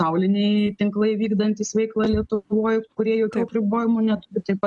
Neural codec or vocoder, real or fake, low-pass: none; real; 10.8 kHz